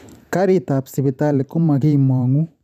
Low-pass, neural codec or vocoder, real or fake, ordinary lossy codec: 14.4 kHz; vocoder, 44.1 kHz, 128 mel bands every 512 samples, BigVGAN v2; fake; none